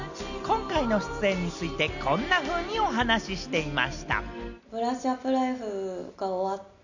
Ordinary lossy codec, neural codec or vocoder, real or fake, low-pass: none; none; real; 7.2 kHz